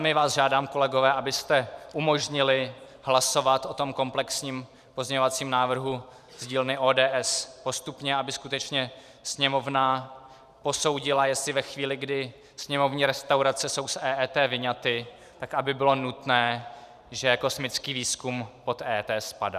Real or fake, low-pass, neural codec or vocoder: fake; 14.4 kHz; vocoder, 44.1 kHz, 128 mel bands every 512 samples, BigVGAN v2